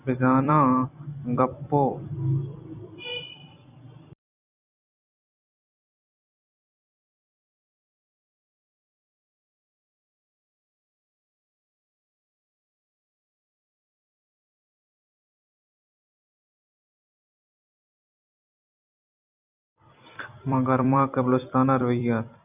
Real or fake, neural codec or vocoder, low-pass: fake; vocoder, 44.1 kHz, 128 mel bands every 256 samples, BigVGAN v2; 3.6 kHz